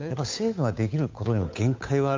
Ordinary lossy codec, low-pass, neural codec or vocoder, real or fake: MP3, 48 kbps; 7.2 kHz; codec, 16 kHz, 8 kbps, FunCodec, trained on Chinese and English, 25 frames a second; fake